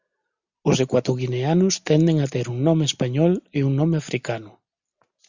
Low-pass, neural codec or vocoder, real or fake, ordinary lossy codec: 7.2 kHz; none; real; Opus, 64 kbps